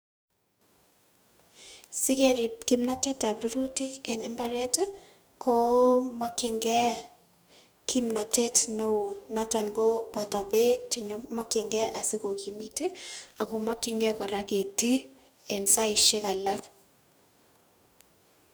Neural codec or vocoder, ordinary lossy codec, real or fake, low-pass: codec, 44.1 kHz, 2.6 kbps, DAC; none; fake; none